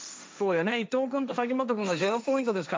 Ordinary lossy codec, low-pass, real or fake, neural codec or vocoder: none; none; fake; codec, 16 kHz, 1.1 kbps, Voila-Tokenizer